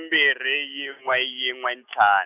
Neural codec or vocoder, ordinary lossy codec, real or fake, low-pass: none; none; real; 3.6 kHz